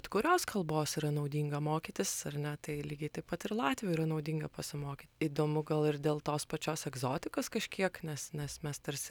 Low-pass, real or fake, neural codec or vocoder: 19.8 kHz; real; none